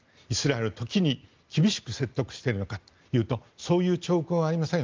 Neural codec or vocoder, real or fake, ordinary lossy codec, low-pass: none; real; Opus, 32 kbps; 7.2 kHz